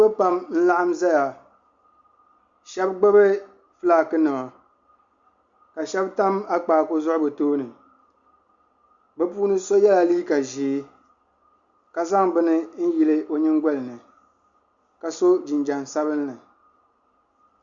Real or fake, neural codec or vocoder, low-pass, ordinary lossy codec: real; none; 7.2 kHz; Opus, 64 kbps